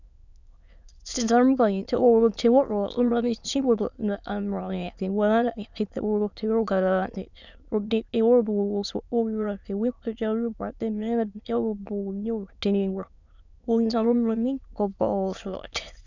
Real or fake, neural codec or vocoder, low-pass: fake; autoencoder, 22.05 kHz, a latent of 192 numbers a frame, VITS, trained on many speakers; 7.2 kHz